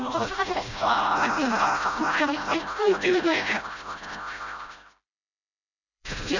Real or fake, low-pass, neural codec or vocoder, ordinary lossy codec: fake; 7.2 kHz; codec, 16 kHz, 0.5 kbps, FreqCodec, smaller model; none